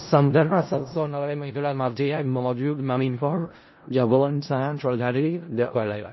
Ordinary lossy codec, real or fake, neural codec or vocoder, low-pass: MP3, 24 kbps; fake; codec, 16 kHz in and 24 kHz out, 0.4 kbps, LongCat-Audio-Codec, four codebook decoder; 7.2 kHz